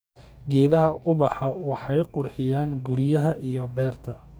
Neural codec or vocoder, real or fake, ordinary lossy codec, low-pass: codec, 44.1 kHz, 2.6 kbps, DAC; fake; none; none